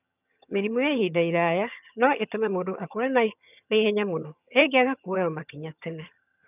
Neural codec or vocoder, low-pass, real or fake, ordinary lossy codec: vocoder, 22.05 kHz, 80 mel bands, HiFi-GAN; 3.6 kHz; fake; none